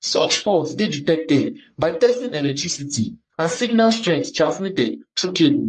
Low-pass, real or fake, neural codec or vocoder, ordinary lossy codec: 10.8 kHz; fake; codec, 44.1 kHz, 1.7 kbps, Pupu-Codec; MP3, 48 kbps